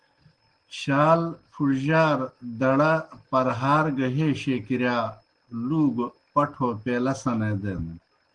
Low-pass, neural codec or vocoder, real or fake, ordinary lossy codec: 9.9 kHz; none; real; Opus, 16 kbps